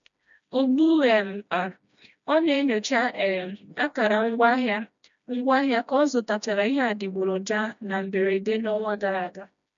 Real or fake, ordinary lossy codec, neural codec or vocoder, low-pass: fake; none; codec, 16 kHz, 1 kbps, FreqCodec, smaller model; 7.2 kHz